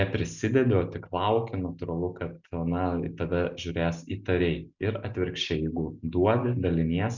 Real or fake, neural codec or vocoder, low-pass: real; none; 7.2 kHz